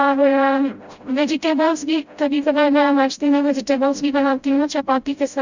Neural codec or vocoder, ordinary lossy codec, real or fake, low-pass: codec, 16 kHz, 0.5 kbps, FreqCodec, smaller model; Opus, 64 kbps; fake; 7.2 kHz